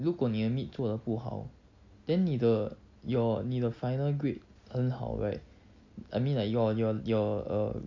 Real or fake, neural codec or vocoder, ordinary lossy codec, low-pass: real; none; MP3, 48 kbps; 7.2 kHz